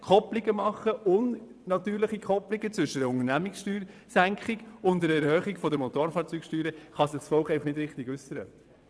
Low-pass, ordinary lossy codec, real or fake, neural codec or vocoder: none; none; fake; vocoder, 22.05 kHz, 80 mel bands, WaveNeXt